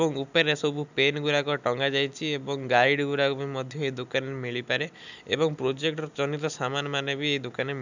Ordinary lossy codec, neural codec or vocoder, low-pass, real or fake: none; none; 7.2 kHz; real